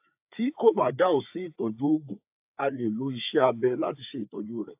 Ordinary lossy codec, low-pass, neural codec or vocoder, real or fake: AAC, 32 kbps; 3.6 kHz; codec, 16 kHz, 4 kbps, FreqCodec, larger model; fake